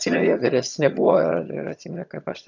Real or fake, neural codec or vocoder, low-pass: fake; vocoder, 22.05 kHz, 80 mel bands, HiFi-GAN; 7.2 kHz